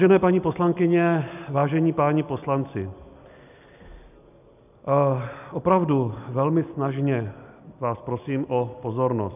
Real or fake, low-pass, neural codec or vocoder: real; 3.6 kHz; none